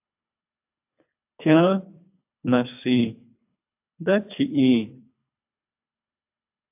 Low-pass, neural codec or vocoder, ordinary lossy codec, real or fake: 3.6 kHz; codec, 24 kHz, 3 kbps, HILCodec; AAC, 32 kbps; fake